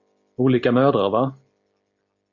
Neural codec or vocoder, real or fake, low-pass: none; real; 7.2 kHz